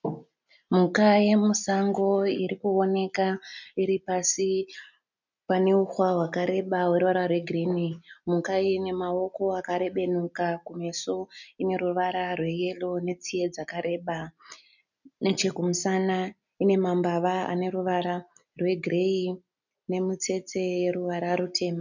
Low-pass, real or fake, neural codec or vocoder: 7.2 kHz; real; none